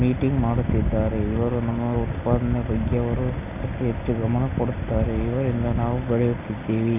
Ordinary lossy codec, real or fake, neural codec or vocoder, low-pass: none; real; none; 3.6 kHz